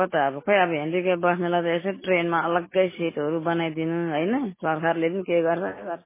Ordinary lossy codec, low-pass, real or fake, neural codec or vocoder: MP3, 16 kbps; 3.6 kHz; real; none